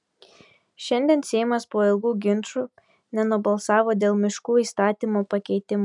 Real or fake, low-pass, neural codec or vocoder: real; 10.8 kHz; none